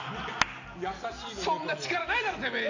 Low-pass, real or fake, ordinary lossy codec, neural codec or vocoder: 7.2 kHz; real; AAC, 48 kbps; none